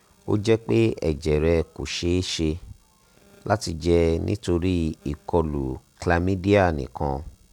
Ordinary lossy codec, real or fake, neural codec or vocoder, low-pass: none; real; none; 19.8 kHz